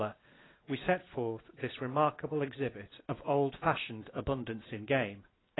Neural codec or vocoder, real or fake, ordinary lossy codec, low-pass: none; real; AAC, 16 kbps; 7.2 kHz